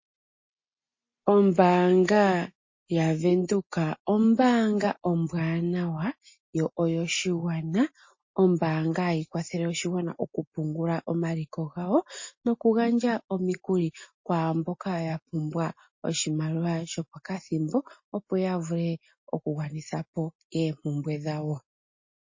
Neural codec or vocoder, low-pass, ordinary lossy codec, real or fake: none; 7.2 kHz; MP3, 32 kbps; real